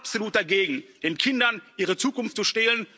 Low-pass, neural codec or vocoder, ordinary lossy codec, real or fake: none; none; none; real